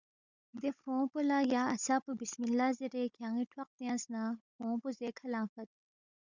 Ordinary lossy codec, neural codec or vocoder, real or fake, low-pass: Opus, 64 kbps; codec, 16 kHz, 16 kbps, FunCodec, trained on Chinese and English, 50 frames a second; fake; 7.2 kHz